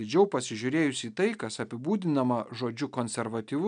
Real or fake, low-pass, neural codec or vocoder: real; 9.9 kHz; none